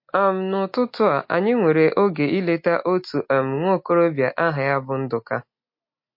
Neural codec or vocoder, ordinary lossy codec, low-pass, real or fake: none; MP3, 32 kbps; 5.4 kHz; real